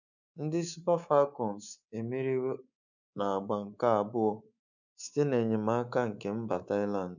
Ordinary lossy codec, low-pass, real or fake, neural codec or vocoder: none; 7.2 kHz; fake; codec, 24 kHz, 3.1 kbps, DualCodec